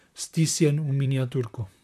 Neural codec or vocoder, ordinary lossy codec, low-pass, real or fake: vocoder, 44.1 kHz, 128 mel bands, Pupu-Vocoder; none; 14.4 kHz; fake